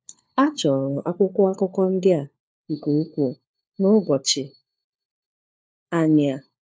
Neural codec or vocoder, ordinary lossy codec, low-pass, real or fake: codec, 16 kHz, 4 kbps, FunCodec, trained on LibriTTS, 50 frames a second; none; none; fake